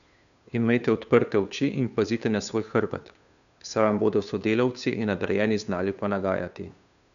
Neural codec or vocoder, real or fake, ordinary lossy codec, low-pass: codec, 16 kHz, 2 kbps, FunCodec, trained on Chinese and English, 25 frames a second; fake; none; 7.2 kHz